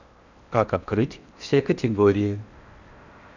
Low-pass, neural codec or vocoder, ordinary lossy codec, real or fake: 7.2 kHz; codec, 16 kHz in and 24 kHz out, 0.6 kbps, FocalCodec, streaming, 4096 codes; none; fake